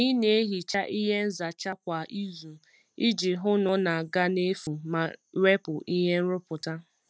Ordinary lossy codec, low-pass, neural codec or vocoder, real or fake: none; none; none; real